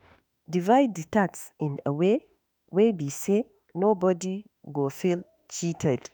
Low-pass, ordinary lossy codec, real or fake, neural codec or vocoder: none; none; fake; autoencoder, 48 kHz, 32 numbers a frame, DAC-VAE, trained on Japanese speech